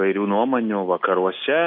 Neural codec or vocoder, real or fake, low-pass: none; real; 5.4 kHz